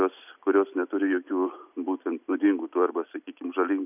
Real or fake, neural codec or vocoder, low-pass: real; none; 3.6 kHz